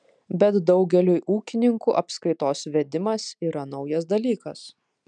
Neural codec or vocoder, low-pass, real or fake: none; 9.9 kHz; real